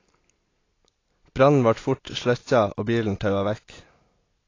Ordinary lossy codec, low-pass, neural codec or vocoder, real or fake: AAC, 32 kbps; 7.2 kHz; none; real